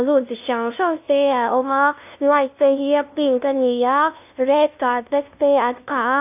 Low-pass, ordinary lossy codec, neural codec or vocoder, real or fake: 3.6 kHz; AAC, 32 kbps; codec, 16 kHz, 0.5 kbps, FunCodec, trained on LibriTTS, 25 frames a second; fake